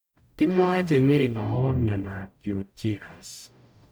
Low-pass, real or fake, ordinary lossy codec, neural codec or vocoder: none; fake; none; codec, 44.1 kHz, 0.9 kbps, DAC